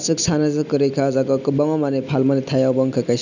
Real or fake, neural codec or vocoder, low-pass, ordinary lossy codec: real; none; 7.2 kHz; none